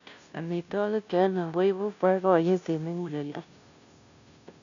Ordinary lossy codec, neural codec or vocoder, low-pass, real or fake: none; codec, 16 kHz, 0.5 kbps, FunCodec, trained on Chinese and English, 25 frames a second; 7.2 kHz; fake